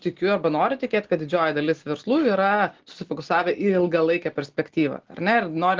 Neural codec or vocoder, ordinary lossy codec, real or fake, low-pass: none; Opus, 16 kbps; real; 7.2 kHz